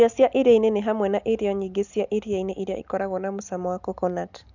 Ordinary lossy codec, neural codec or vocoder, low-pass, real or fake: none; none; 7.2 kHz; real